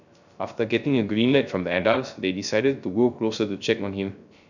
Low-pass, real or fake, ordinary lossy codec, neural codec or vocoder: 7.2 kHz; fake; none; codec, 16 kHz, 0.3 kbps, FocalCodec